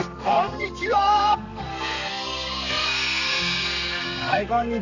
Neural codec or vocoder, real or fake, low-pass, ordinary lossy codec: codec, 32 kHz, 1.9 kbps, SNAC; fake; 7.2 kHz; none